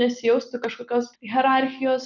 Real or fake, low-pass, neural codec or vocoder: real; 7.2 kHz; none